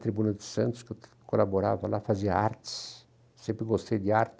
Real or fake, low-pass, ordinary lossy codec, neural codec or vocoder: real; none; none; none